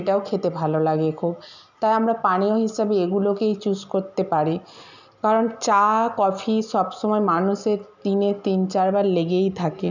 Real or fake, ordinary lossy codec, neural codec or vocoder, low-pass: real; none; none; 7.2 kHz